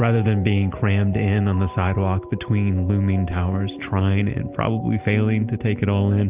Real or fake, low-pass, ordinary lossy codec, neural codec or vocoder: real; 3.6 kHz; Opus, 24 kbps; none